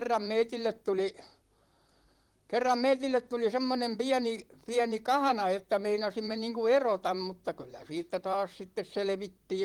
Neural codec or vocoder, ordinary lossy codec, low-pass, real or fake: vocoder, 44.1 kHz, 128 mel bands, Pupu-Vocoder; Opus, 24 kbps; 19.8 kHz; fake